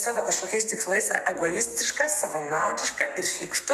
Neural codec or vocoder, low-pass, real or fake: codec, 32 kHz, 1.9 kbps, SNAC; 14.4 kHz; fake